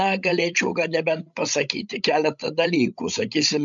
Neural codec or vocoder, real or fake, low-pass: codec, 16 kHz, 16 kbps, FreqCodec, larger model; fake; 7.2 kHz